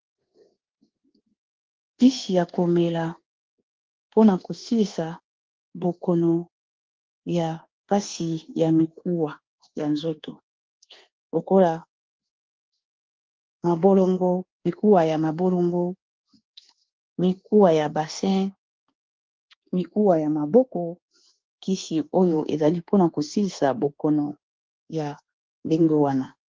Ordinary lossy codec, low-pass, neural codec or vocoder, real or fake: Opus, 16 kbps; 7.2 kHz; codec, 24 kHz, 1.2 kbps, DualCodec; fake